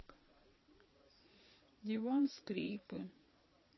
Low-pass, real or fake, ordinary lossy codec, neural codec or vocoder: 7.2 kHz; fake; MP3, 24 kbps; vocoder, 22.05 kHz, 80 mel bands, Vocos